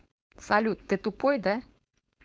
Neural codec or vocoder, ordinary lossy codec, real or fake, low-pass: codec, 16 kHz, 4.8 kbps, FACodec; none; fake; none